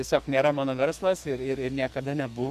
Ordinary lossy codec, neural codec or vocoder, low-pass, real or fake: MP3, 96 kbps; codec, 44.1 kHz, 2.6 kbps, SNAC; 14.4 kHz; fake